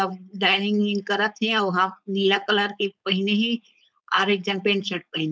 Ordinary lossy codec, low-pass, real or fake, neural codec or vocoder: none; none; fake; codec, 16 kHz, 4.8 kbps, FACodec